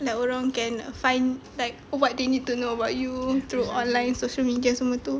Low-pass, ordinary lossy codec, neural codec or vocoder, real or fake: none; none; none; real